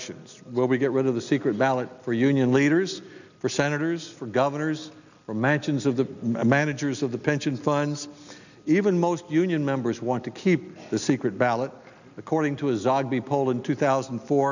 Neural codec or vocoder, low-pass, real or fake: none; 7.2 kHz; real